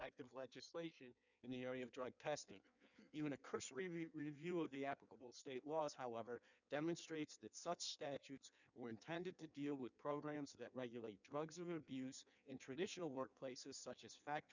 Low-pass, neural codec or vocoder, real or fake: 7.2 kHz; codec, 16 kHz in and 24 kHz out, 1.1 kbps, FireRedTTS-2 codec; fake